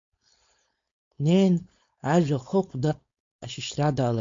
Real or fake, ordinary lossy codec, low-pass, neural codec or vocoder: fake; MP3, 48 kbps; 7.2 kHz; codec, 16 kHz, 4.8 kbps, FACodec